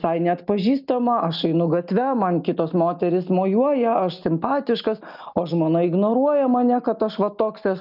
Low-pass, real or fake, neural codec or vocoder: 5.4 kHz; real; none